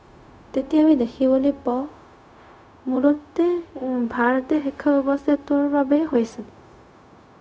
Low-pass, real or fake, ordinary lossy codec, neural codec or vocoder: none; fake; none; codec, 16 kHz, 0.4 kbps, LongCat-Audio-Codec